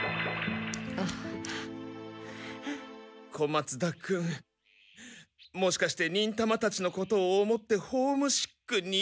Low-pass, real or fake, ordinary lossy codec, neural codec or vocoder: none; real; none; none